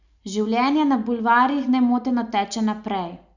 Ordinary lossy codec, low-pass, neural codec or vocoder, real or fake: none; 7.2 kHz; none; real